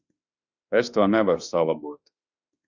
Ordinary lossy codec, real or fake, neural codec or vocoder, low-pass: Opus, 64 kbps; fake; autoencoder, 48 kHz, 32 numbers a frame, DAC-VAE, trained on Japanese speech; 7.2 kHz